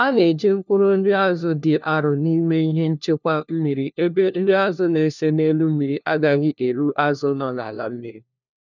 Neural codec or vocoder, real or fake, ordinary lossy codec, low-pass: codec, 16 kHz, 1 kbps, FunCodec, trained on LibriTTS, 50 frames a second; fake; none; 7.2 kHz